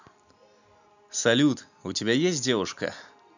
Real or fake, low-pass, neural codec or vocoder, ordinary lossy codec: real; 7.2 kHz; none; none